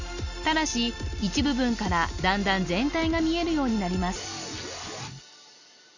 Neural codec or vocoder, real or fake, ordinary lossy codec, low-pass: none; real; none; 7.2 kHz